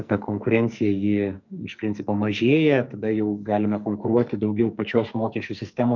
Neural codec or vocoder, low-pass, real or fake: codec, 44.1 kHz, 2.6 kbps, SNAC; 7.2 kHz; fake